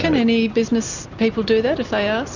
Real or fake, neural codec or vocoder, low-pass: real; none; 7.2 kHz